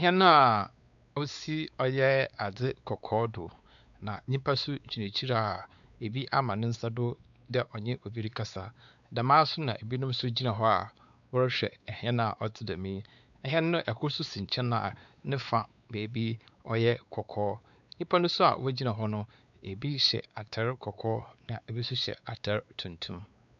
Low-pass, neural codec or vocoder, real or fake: 7.2 kHz; codec, 16 kHz, 4 kbps, X-Codec, WavLM features, trained on Multilingual LibriSpeech; fake